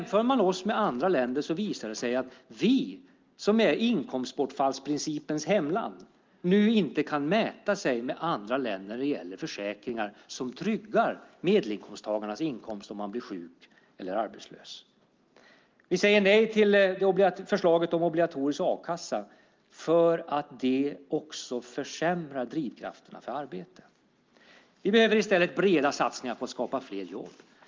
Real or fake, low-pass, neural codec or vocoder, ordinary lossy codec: real; 7.2 kHz; none; Opus, 32 kbps